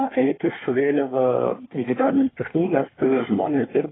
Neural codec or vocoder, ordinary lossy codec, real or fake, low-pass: codec, 24 kHz, 1 kbps, SNAC; AAC, 16 kbps; fake; 7.2 kHz